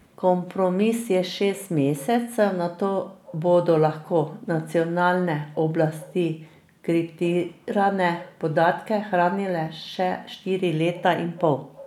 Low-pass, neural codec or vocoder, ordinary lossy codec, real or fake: 19.8 kHz; none; none; real